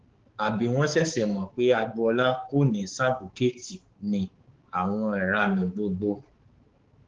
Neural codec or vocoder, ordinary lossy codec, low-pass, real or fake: codec, 16 kHz, 4 kbps, X-Codec, HuBERT features, trained on balanced general audio; Opus, 16 kbps; 7.2 kHz; fake